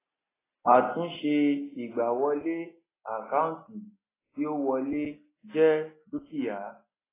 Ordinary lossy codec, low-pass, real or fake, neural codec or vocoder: AAC, 16 kbps; 3.6 kHz; real; none